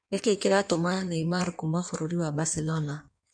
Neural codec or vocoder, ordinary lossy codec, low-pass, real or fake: codec, 16 kHz in and 24 kHz out, 1.1 kbps, FireRedTTS-2 codec; none; 9.9 kHz; fake